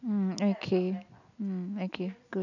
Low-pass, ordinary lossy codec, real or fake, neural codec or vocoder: 7.2 kHz; none; real; none